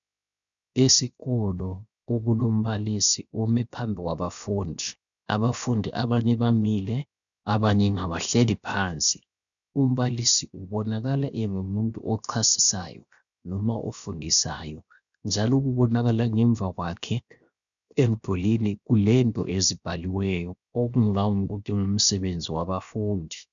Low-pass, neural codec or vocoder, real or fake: 7.2 kHz; codec, 16 kHz, 0.7 kbps, FocalCodec; fake